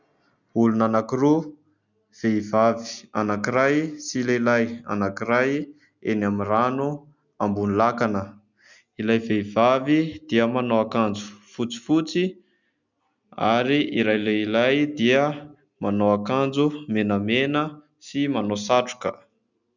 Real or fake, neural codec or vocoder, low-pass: real; none; 7.2 kHz